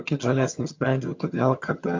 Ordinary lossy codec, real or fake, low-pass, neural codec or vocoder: MP3, 48 kbps; fake; 7.2 kHz; vocoder, 22.05 kHz, 80 mel bands, HiFi-GAN